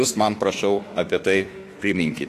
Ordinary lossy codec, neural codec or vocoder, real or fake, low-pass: AAC, 48 kbps; autoencoder, 48 kHz, 32 numbers a frame, DAC-VAE, trained on Japanese speech; fake; 14.4 kHz